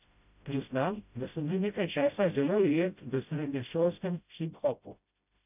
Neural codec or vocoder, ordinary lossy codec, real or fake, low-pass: codec, 16 kHz, 0.5 kbps, FreqCodec, smaller model; none; fake; 3.6 kHz